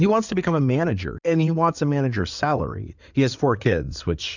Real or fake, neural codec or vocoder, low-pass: fake; codec, 16 kHz in and 24 kHz out, 2.2 kbps, FireRedTTS-2 codec; 7.2 kHz